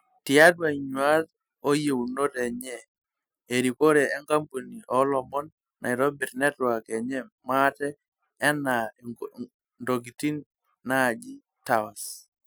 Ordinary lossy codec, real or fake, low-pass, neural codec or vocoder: none; real; none; none